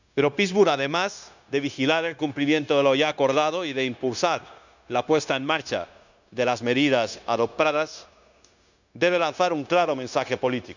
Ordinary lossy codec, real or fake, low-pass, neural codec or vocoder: none; fake; 7.2 kHz; codec, 16 kHz, 0.9 kbps, LongCat-Audio-Codec